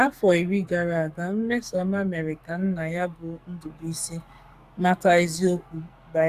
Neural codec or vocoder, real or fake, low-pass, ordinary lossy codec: codec, 44.1 kHz, 2.6 kbps, SNAC; fake; 14.4 kHz; Opus, 64 kbps